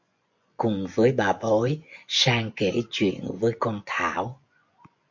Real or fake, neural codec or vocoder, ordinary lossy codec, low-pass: fake; vocoder, 24 kHz, 100 mel bands, Vocos; MP3, 48 kbps; 7.2 kHz